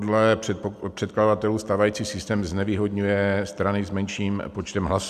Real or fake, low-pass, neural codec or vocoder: real; 14.4 kHz; none